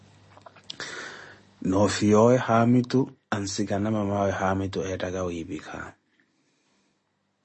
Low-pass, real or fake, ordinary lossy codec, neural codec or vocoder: 10.8 kHz; real; MP3, 32 kbps; none